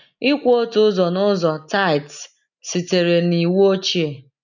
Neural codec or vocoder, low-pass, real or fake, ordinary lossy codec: none; 7.2 kHz; real; none